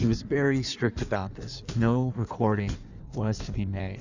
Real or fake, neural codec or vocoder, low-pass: fake; codec, 16 kHz in and 24 kHz out, 1.1 kbps, FireRedTTS-2 codec; 7.2 kHz